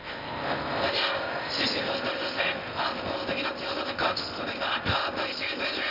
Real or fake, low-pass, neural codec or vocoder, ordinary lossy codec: fake; 5.4 kHz; codec, 16 kHz in and 24 kHz out, 0.6 kbps, FocalCodec, streaming, 4096 codes; none